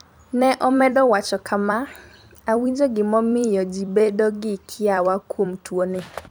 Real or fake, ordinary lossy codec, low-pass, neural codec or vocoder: fake; none; none; vocoder, 44.1 kHz, 128 mel bands every 256 samples, BigVGAN v2